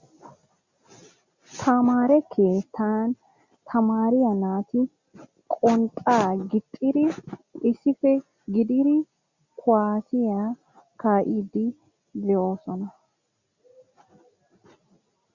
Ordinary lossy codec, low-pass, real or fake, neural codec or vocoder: Opus, 64 kbps; 7.2 kHz; real; none